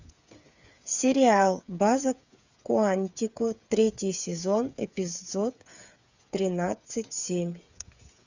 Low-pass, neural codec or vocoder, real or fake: 7.2 kHz; codec, 16 kHz, 8 kbps, FreqCodec, smaller model; fake